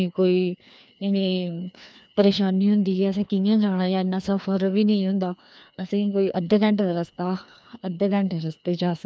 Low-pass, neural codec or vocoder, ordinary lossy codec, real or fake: none; codec, 16 kHz, 2 kbps, FreqCodec, larger model; none; fake